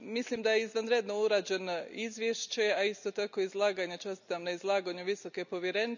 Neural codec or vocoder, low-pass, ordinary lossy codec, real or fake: none; 7.2 kHz; none; real